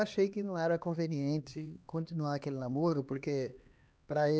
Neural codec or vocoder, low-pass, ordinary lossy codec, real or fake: codec, 16 kHz, 2 kbps, X-Codec, HuBERT features, trained on LibriSpeech; none; none; fake